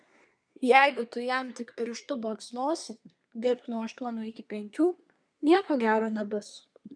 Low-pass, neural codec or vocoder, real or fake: 9.9 kHz; codec, 24 kHz, 1 kbps, SNAC; fake